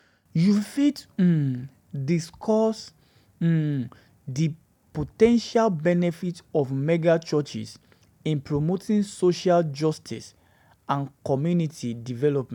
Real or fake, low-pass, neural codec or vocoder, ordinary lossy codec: real; 19.8 kHz; none; none